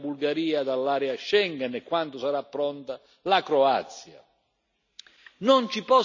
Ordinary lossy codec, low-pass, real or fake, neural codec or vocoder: none; 7.2 kHz; real; none